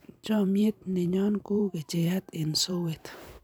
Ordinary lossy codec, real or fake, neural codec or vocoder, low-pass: none; fake; vocoder, 44.1 kHz, 128 mel bands every 512 samples, BigVGAN v2; none